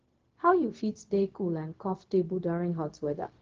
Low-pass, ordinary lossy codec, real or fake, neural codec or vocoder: 7.2 kHz; Opus, 16 kbps; fake; codec, 16 kHz, 0.4 kbps, LongCat-Audio-Codec